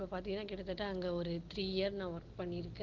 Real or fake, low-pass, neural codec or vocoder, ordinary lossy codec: real; 7.2 kHz; none; Opus, 16 kbps